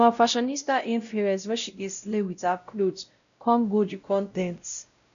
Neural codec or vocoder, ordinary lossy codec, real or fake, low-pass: codec, 16 kHz, 0.5 kbps, X-Codec, WavLM features, trained on Multilingual LibriSpeech; none; fake; 7.2 kHz